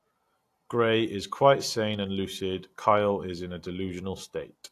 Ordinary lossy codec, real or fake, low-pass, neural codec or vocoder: AAC, 64 kbps; real; 14.4 kHz; none